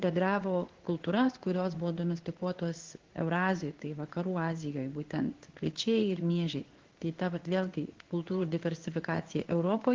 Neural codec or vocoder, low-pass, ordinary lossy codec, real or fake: codec, 16 kHz in and 24 kHz out, 1 kbps, XY-Tokenizer; 7.2 kHz; Opus, 16 kbps; fake